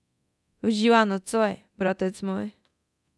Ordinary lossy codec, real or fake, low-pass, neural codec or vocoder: none; fake; none; codec, 24 kHz, 0.9 kbps, DualCodec